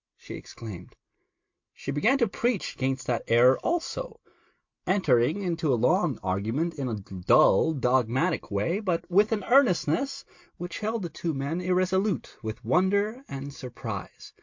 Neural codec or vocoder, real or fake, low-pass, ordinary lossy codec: none; real; 7.2 kHz; MP3, 48 kbps